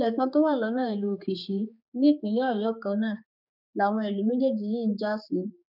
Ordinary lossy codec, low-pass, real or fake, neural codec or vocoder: none; 5.4 kHz; fake; codec, 16 kHz, 4 kbps, X-Codec, HuBERT features, trained on general audio